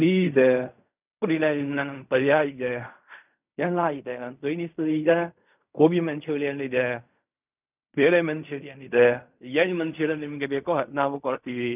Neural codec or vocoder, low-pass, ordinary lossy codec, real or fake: codec, 16 kHz in and 24 kHz out, 0.4 kbps, LongCat-Audio-Codec, fine tuned four codebook decoder; 3.6 kHz; none; fake